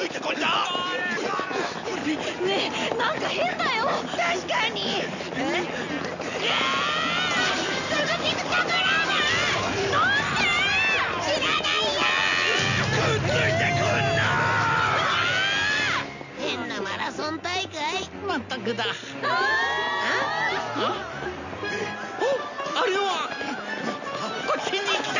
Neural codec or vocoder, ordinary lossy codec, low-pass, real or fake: none; none; 7.2 kHz; real